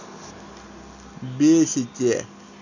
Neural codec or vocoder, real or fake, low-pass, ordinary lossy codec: none; real; 7.2 kHz; none